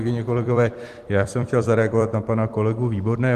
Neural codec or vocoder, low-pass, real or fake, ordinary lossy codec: vocoder, 44.1 kHz, 128 mel bands every 256 samples, BigVGAN v2; 14.4 kHz; fake; Opus, 24 kbps